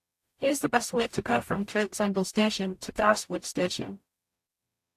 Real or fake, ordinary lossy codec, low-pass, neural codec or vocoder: fake; AAC, 64 kbps; 14.4 kHz; codec, 44.1 kHz, 0.9 kbps, DAC